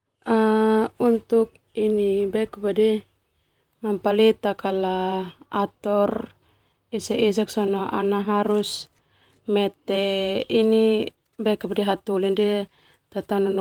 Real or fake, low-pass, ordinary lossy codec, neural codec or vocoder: fake; 19.8 kHz; Opus, 32 kbps; vocoder, 44.1 kHz, 128 mel bands, Pupu-Vocoder